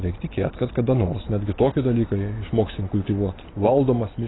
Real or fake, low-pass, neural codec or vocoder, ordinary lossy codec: real; 7.2 kHz; none; AAC, 16 kbps